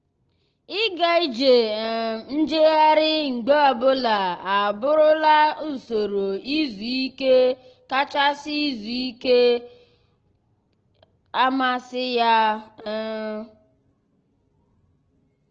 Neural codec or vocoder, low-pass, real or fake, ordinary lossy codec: none; 7.2 kHz; real; Opus, 16 kbps